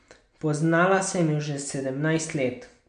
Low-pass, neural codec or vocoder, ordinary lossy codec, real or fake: 9.9 kHz; none; MP3, 64 kbps; real